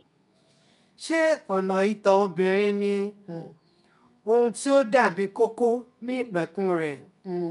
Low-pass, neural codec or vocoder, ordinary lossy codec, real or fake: 10.8 kHz; codec, 24 kHz, 0.9 kbps, WavTokenizer, medium music audio release; none; fake